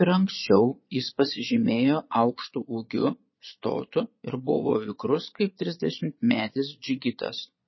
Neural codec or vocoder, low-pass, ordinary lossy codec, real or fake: vocoder, 22.05 kHz, 80 mel bands, Vocos; 7.2 kHz; MP3, 24 kbps; fake